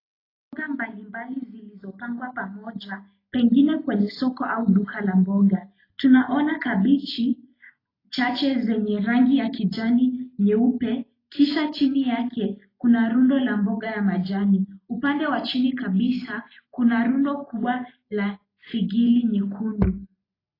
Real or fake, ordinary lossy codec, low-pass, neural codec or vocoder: real; AAC, 24 kbps; 5.4 kHz; none